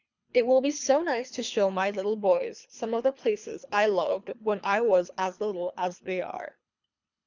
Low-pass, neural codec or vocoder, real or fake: 7.2 kHz; codec, 24 kHz, 3 kbps, HILCodec; fake